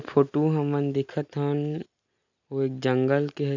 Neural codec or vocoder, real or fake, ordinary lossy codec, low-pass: none; real; none; 7.2 kHz